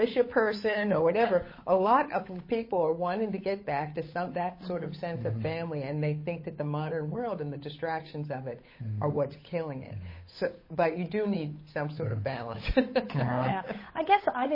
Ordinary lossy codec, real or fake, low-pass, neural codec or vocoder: MP3, 24 kbps; fake; 5.4 kHz; codec, 16 kHz, 8 kbps, FunCodec, trained on Chinese and English, 25 frames a second